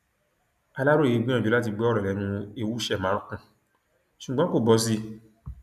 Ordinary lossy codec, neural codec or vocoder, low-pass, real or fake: none; none; 14.4 kHz; real